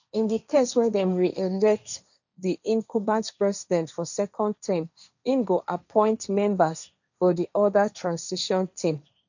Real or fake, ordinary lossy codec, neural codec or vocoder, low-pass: fake; none; codec, 16 kHz, 1.1 kbps, Voila-Tokenizer; 7.2 kHz